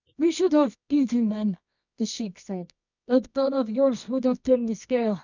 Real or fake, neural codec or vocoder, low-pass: fake; codec, 24 kHz, 0.9 kbps, WavTokenizer, medium music audio release; 7.2 kHz